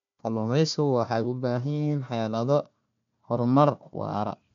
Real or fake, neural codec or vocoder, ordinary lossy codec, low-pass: fake; codec, 16 kHz, 1 kbps, FunCodec, trained on Chinese and English, 50 frames a second; MP3, 64 kbps; 7.2 kHz